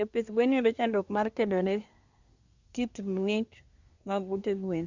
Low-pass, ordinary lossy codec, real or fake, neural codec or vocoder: 7.2 kHz; Opus, 64 kbps; fake; codec, 24 kHz, 1 kbps, SNAC